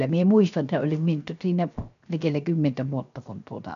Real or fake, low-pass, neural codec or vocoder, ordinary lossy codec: fake; 7.2 kHz; codec, 16 kHz, 0.7 kbps, FocalCodec; none